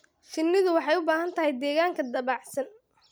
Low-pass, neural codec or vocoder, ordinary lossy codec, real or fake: none; none; none; real